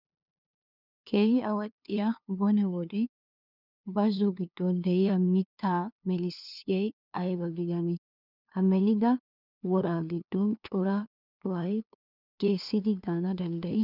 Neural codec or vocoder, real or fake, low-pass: codec, 16 kHz, 2 kbps, FunCodec, trained on LibriTTS, 25 frames a second; fake; 5.4 kHz